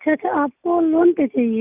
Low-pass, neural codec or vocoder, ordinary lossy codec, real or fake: 3.6 kHz; none; none; real